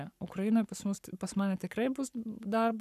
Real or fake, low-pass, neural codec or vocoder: fake; 14.4 kHz; codec, 44.1 kHz, 7.8 kbps, Pupu-Codec